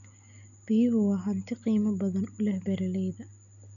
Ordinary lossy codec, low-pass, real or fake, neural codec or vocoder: none; 7.2 kHz; real; none